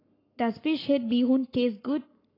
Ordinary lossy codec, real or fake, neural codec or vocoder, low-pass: AAC, 24 kbps; fake; codec, 44.1 kHz, 7.8 kbps, Pupu-Codec; 5.4 kHz